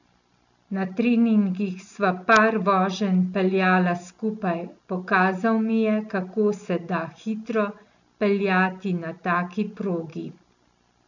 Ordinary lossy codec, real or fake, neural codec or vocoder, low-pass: none; real; none; 7.2 kHz